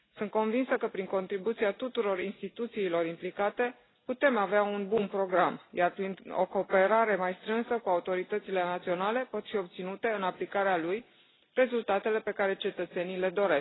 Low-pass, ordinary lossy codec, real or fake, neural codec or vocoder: 7.2 kHz; AAC, 16 kbps; real; none